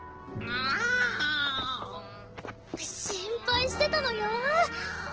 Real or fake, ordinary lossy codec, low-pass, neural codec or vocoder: real; Opus, 16 kbps; 7.2 kHz; none